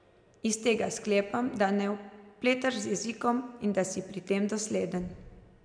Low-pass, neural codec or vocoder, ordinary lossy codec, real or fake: 9.9 kHz; none; none; real